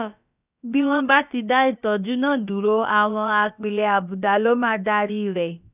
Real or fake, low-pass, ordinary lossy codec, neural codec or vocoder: fake; 3.6 kHz; none; codec, 16 kHz, about 1 kbps, DyCAST, with the encoder's durations